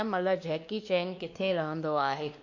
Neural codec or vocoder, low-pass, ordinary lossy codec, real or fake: codec, 16 kHz, 2 kbps, X-Codec, WavLM features, trained on Multilingual LibriSpeech; 7.2 kHz; none; fake